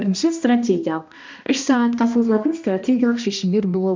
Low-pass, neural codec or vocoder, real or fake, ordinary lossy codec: 7.2 kHz; codec, 16 kHz, 1 kbps, X-Codec, HuBERT features, trained on balanced general audio; fake; MP3, 64 kbps